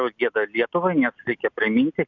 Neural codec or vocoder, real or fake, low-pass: none; real; 7.2 kHz